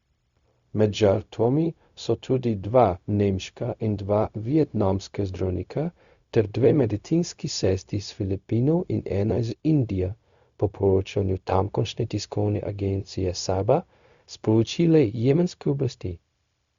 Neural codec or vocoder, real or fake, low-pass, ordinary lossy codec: codec, 16 kHz, 0.4 kbps, LongCat-Audio-Codec; fake; 7.2 kHz; Opus, 64 kbps